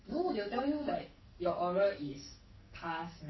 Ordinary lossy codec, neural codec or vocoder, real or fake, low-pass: MP3, 24 kbps; codec, 44.1 kHz, 2.6 kbps, SNAC; fake; 7.2 kHz